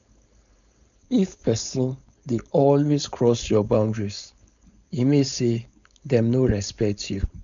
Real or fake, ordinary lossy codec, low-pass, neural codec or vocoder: fake; none; 7.2 kHz; codec, 16 kHz, 4.8 kbps, FACodec